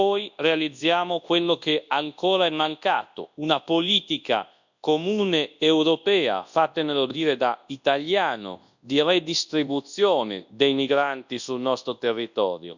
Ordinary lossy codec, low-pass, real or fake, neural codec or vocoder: none; 7.2 kHz; fake; codec, 24 kHz, 0.9 kbps, WavTokenizer, large speech release